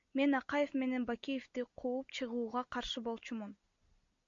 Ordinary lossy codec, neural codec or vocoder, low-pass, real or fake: MP3, 48 kbps; none; 7.2 kHz; real